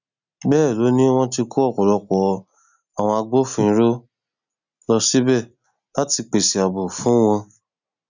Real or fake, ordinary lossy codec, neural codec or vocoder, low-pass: real; none; none; 7.2 kHz